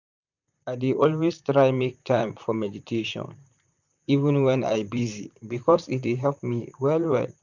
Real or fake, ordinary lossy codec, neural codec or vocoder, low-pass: fake; none; vocoder, 44.1 kHz, 128 mel bands, Pupu-Vocoder; 7.2 kHz